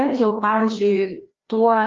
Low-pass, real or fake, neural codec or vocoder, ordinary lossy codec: 7.2 kHz; fake; codec, 16 kHz, 1 kbps, FreqCodec, larger model; Opus, 32 kbps